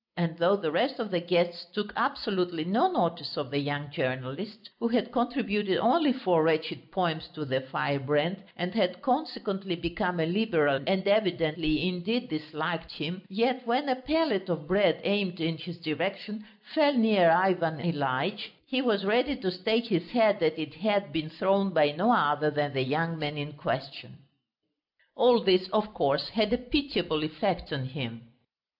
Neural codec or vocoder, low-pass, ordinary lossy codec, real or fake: none; 5.4 kHz; AAC, 48 kbps; real